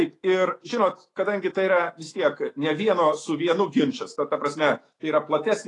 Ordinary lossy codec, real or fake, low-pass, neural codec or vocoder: AAC, 32 kbps; fake; 10.8 kHz; autoencoder, 48 kHz, 128 numbers a frame, DAC-VAE, trained on Japanese speech